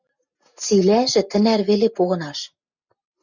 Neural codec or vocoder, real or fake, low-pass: none; real; 7.2 kHz